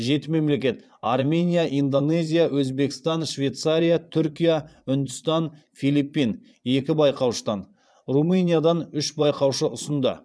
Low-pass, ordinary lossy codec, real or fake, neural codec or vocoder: none; none; fake; vocoder, 22.05 kHz, 80 mel bands, Vocos